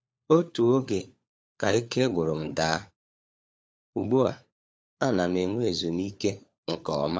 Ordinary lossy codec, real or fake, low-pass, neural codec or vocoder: none; fake; none; codec, 16 kHz, 4 kbps, FunCodec, trained on LibriTTS, 50 frames a second